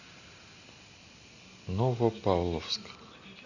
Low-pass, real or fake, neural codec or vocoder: 7.2 kHz; real; none